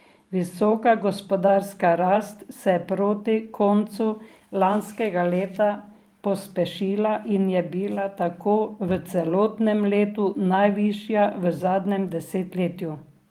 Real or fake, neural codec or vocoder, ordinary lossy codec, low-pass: fake; vocoder, 44.1 kHz, 128 mel bands every 512 samples, BigVGAN v2; Opus, 24 kbps; 19.8 kHz